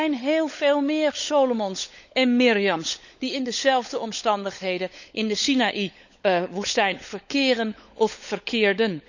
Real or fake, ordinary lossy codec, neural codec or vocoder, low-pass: fake; none; codec, 16 kHz, 16 kbps, FunCodec, trained on LibriTTS, 50 frames a second; 7.2 kHz